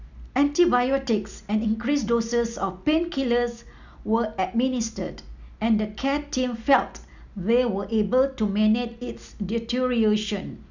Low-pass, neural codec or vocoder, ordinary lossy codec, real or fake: 7.2 kHz; none; none; real